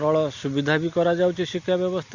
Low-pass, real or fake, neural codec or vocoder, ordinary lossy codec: 7.2 kHz; real; none; Opus, 64 kbps